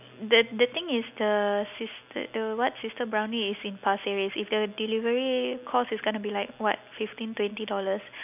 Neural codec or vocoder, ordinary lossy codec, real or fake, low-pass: none; none; real; 3.6 kHz